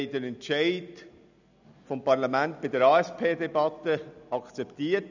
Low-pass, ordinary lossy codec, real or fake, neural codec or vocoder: 7.2 kHz; none; real; none